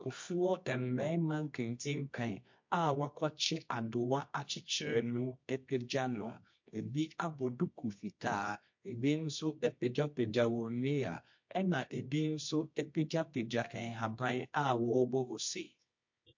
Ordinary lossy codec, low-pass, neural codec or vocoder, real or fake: MP3, 48 kbps; 7.2 kHz; codec, 24 kHz, 0.9 kbps, WavTokenizer, medium music audio release; fake